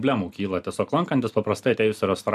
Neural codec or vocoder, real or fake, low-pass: none; real; 14.4 kHz